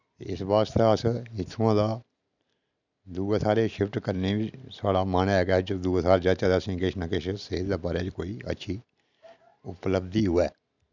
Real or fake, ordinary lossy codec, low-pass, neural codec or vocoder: real; none; 7.2 kHz; none